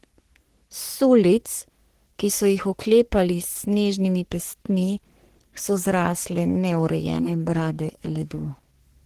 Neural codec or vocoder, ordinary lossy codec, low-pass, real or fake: codec, 44.1 kHz, 3.4 kbps, Pupu-Codec; Opus, 16 kbps; 14.4 kHz; fake